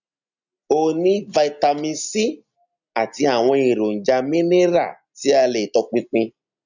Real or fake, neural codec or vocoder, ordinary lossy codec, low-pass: real; none; none; 7.2 kHz